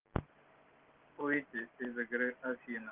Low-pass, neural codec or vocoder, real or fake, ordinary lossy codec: 3.6 kHz; none; real; Opus, 16 kbps